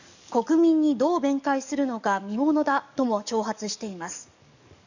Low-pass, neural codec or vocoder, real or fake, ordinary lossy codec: 7.2 kHz; codec, 44.1 kHz, 7.8 kbps, DAC; fake; none